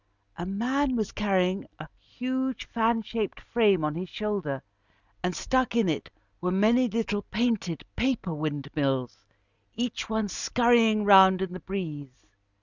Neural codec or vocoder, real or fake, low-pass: none; real; 7.2 kHz